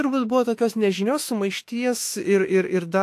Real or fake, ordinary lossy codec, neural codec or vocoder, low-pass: fake; AAC, 64 kbps; autoencoder, 48 kHz, 32 numbers a frame, DAC-VAE, trained on Japanese speech; 14.4 kHz